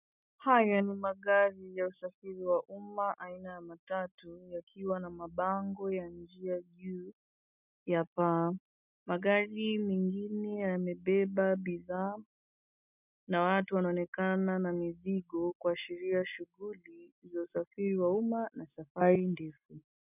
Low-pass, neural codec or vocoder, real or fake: 3.6 kHz; none; real